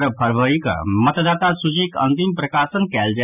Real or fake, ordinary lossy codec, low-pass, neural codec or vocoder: real; none; 3.6 kHz; none